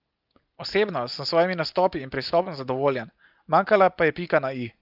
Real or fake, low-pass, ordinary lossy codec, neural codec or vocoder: real; 5.4 kHz; Opus, 32 kbps; none